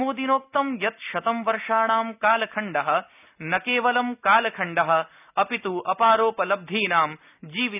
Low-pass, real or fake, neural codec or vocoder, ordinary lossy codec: 3.6 kHz; real; none; none